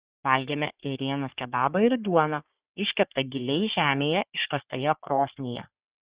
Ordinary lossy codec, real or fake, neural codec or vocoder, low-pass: Opus, 64 kbps; fake; codec, 44.1 kHz, 3.4 kbps, Pupu-Codec; 3.6 kHz